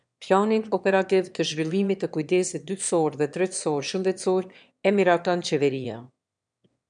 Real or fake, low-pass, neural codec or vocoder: fake; 9.9 kHz; autoencoder, 22.05 kHz, a latent of 192 numbers a frame, VITS, trained on one speaker